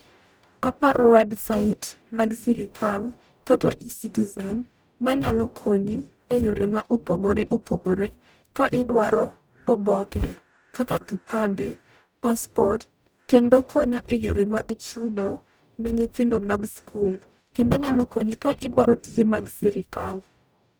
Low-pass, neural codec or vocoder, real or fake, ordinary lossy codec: none; codec, 44.1 kHz, 0.9 kbps, DAC; fake; none